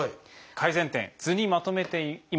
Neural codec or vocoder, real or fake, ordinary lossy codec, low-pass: none; real; none; none